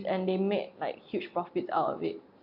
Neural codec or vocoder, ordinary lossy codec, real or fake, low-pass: none; AAC, 48 kbps; real; 5.4 kHz